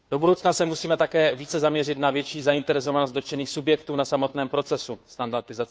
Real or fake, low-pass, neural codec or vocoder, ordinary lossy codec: fake; none; codec, 16 kHz, 2 kbps, FunCodec, trained on Chinese and English, 25 frames a second; none